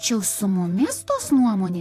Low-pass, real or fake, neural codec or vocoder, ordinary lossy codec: 14.4 kHz; fake; vocoder, 44.1 kHz, 128 mel bands, Pupu-Vocoder; AAC, 64 kbps